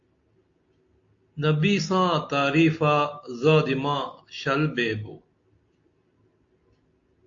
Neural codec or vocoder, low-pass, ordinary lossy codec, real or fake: none; 7.2 kHz; AAC, 48 kbps; real